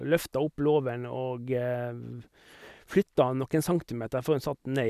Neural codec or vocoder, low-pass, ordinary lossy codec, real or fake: none; 14.4 kHz; none; real